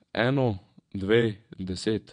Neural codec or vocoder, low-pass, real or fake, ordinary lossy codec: vocoder, 22.05 kHz, 80 mel bands, WaveNeXt; 9.9 kHz; fake; MP3, 64 kbps